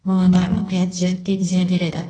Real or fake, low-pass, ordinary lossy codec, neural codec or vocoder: fake; 9.9 kHz; AAC, 32 kbps; codec, 24 kHz, 0.9 kbps, WavTokenizer, medium music audio release